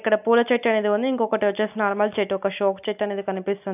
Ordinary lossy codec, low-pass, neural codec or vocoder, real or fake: none; 3.6 kHz; none; real